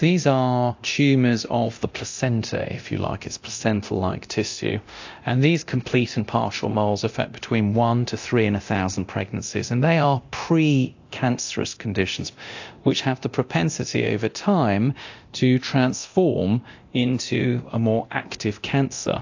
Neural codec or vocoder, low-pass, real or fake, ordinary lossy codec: codec, 24 kHz, 0.9 kbps, DualCodec; 7.2 kHz; fake; AAC, 48 kbps